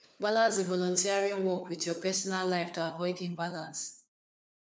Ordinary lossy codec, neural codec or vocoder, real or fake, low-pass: none; codec, 16 kHz, 4 kbps, FunCodec, trained on LibriTTS, 50 frames a second; fake; none